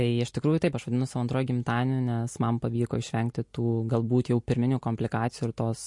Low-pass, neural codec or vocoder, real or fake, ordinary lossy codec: 10.8 kHz; none; real; MP3, 48 kbps